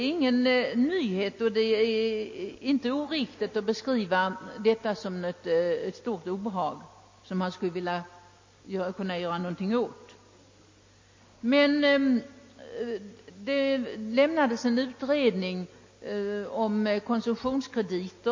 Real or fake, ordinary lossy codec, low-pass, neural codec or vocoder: real; MP3, 32 kbps; 7.2 kHz; none